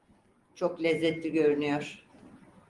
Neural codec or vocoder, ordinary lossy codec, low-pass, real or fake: none; Opus, 24 kbps; 10.8 kHz; real